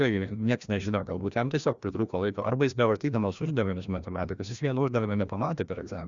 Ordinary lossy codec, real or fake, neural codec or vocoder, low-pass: Opus, 64 kbps; fake; codec, 16 kHz, 1 kbps, FreqCodec, larger model; 7.2 kHz